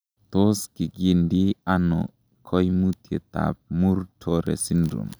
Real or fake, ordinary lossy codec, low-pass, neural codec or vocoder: real; none; none; none